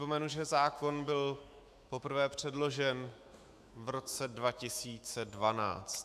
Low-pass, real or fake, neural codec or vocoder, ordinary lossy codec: 14.4 kHz; fake; autoencoder, 48 kHz, 128 numbers a frame, DAC-VAE, trained on Japanese speech; MP3, 96 kbps